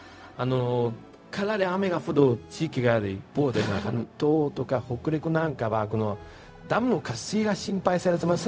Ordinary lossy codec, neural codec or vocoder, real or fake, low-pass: none; codec, 16 kHz, 0.4 kbps, LongCat-Audio-Codec; fake; none